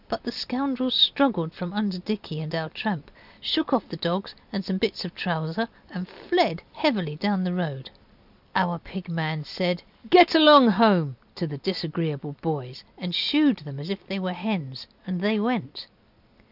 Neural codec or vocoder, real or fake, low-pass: none; real; 5.4 kHz